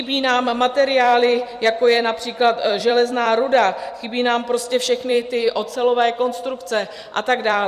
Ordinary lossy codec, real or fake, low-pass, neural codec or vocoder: Opus, 64 kbps; fake; 14.4 kHz; vocoder, 44.1 kHz, 128 mel bands every 256 samples, BigVGAN v2